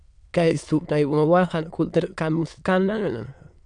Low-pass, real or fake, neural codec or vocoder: 9.9 kHz; fake; autoencoder, 22.05 kHz, a latent of 192 numbers a frame, VITS, trained on many speakers